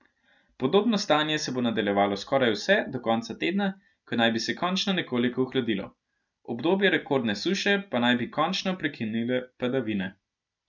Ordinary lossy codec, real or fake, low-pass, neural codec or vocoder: none; real; 7.2 kHz; none